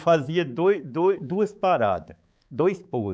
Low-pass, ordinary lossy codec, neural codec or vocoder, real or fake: none; none; codec, 16 kHz, 4 kbps, X-Codec, HuBERT features, trained on balanced general audio; fake